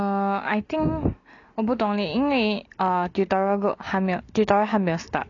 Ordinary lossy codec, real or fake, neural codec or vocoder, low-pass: none; real; none; 7.2 kHz